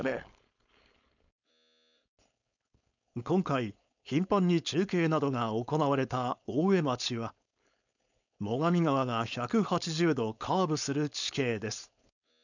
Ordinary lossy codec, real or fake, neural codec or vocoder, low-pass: none; fake; codec, 16 kHz, 4.8 kbps, FACodec; 7.2 kHz